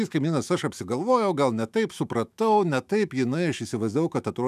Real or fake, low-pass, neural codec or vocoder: fake; 14.4 kHz; autoencoder, 48 kHz, 128 numbers a frame, DAC-VAE, trained on Japanese speech